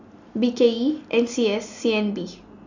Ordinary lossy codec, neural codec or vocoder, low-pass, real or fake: none; none; 7.2 kHz; real